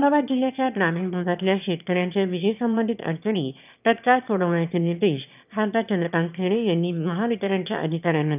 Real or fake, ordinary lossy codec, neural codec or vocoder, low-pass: fake; none; autoencoder, 22.05 kHz, a latent of 192 numbers a frame, VITS, trained on one speaker; 3.6 kHz